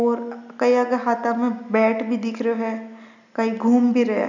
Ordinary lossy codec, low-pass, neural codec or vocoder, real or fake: none; 7.2 kHz; none; real